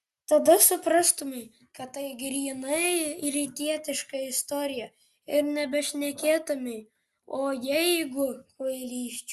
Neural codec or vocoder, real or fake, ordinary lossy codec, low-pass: none; real; Opus, 64 kbps; 14.4 kHz